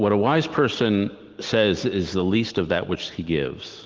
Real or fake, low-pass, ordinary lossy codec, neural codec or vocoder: real; 7.2 kHz; Opus, 16 kbps; none